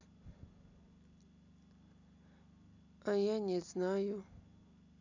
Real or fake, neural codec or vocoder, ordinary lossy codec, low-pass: real; none; none; 7.2 kHz